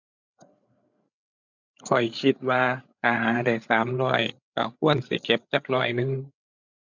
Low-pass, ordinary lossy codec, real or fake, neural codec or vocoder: 7.2 kHz; none; fake; codec, 16 kHz, 8 kbps, FreqCodec, larger model